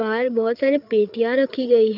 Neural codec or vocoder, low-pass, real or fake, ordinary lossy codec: codec, 16 kHz, 8 kbps, FreqCodec, larger model; 5.4 kHz; fake; none